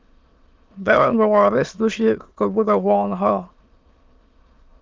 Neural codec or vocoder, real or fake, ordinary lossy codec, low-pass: autoencoder, 22.05 kHz, a latent of 192 numbers a frame, VITS, trained on many speakers; fake; Opus, 32 kbps; 7.2 kHz